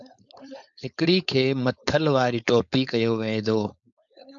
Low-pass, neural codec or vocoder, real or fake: 7.2 kHz; codec, 16 kHz, 4.8 kbps, FACodec; fake